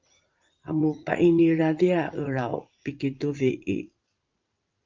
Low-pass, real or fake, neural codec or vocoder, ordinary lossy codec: 7.2 kHz; fake; vocoder, 44.1 kHz, 80 mel bands, Vocos; Opus, 24 kbps